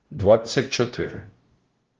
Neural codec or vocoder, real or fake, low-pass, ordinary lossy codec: codec, 16 kHz, 0.5 kbps, FunCodec, trained on LibriTTS, 25 frames a second; fake; 7.2 kHz; Opus, 16 kbps